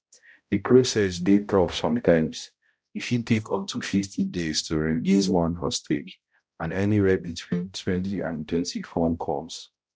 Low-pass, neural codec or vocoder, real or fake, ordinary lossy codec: none; codec, 16 kHz, 0.5 kbps, X-Codec, HuBERT features, trained on balanced general audio; fake; none